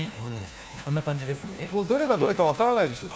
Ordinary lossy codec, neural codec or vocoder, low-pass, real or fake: none; codec, 16 kHz, 1 kbps, FunCodec, trained on LibriTTS, 50 frames a second; none; fake